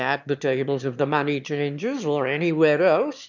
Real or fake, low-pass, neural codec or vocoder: fake; 7.2 kHz; autoencoder, 22.05 kHz, a latent of 192 numbers a frame, VITS, trained on one speaker